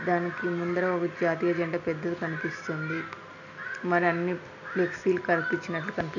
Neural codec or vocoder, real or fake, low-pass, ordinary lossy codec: none; real; 7.2 kHz; none